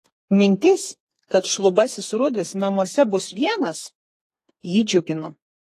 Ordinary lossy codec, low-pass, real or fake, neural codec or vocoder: AAC, 48 kbps; 14.4 kHz; fake; codec, 32 kHz, 1.9 kbps, SNAC